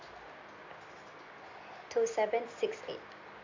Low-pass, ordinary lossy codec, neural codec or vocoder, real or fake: 7.2 kHz; MP3, 64 kbps; none; real